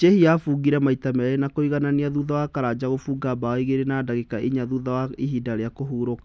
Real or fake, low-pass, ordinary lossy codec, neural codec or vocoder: real; none; none; none